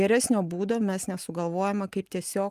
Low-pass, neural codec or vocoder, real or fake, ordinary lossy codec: 14.4 kHz; none; real; Opus, 32 kbps